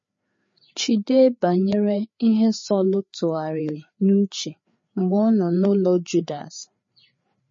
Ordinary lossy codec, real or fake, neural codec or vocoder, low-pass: MP3, 32 kbps; fake; codec, 16 kHz, 4 kbps, FreqCodec, larger model; 7.2 kHz